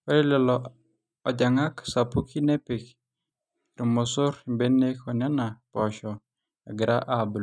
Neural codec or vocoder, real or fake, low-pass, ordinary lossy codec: none; real; none; none